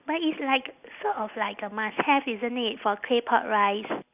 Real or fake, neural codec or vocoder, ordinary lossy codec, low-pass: real; none; none; 3.6 kHz